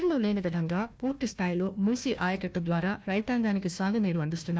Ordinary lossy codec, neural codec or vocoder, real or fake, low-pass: none; codec, 16 kHz, 1 kbps, FunCodec, trained on Chinese and English, 50 frames a second; fake; none